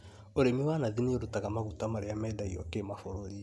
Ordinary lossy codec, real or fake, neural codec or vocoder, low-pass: none; real; none; none